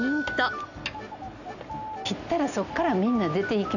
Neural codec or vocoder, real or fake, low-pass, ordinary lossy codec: none; real; 7.2 kHz; none